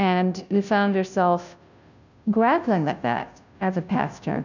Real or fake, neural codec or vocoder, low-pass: fake; codec, 16 kHz, 0.5 kbps, FunCodec, trained on Chinese and English, 25 frames a second; 7.2 kHz